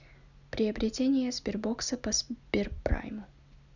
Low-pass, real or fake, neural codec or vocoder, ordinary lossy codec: 7.2 kHz; real; none; none